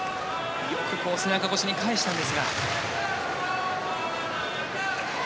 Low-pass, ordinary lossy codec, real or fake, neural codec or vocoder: none; none; real; none